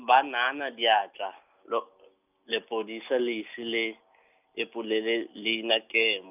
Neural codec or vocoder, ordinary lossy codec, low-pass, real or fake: none; none; 3.6 kHz; real